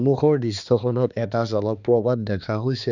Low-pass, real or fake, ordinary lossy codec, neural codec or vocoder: 7.2 kHz; fake; none; codec, 16 kHz, 2 kbps, X-Codec, HuBERT features, trained on balanced general audio